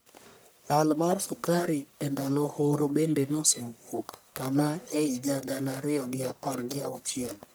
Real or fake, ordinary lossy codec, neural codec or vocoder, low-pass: fake; none; codec, 44.1 kHz, 1.7 kbps, Pupu-Codec; none